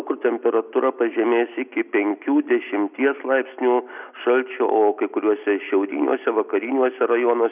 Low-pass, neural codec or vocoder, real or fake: 3.6 kHz; none; real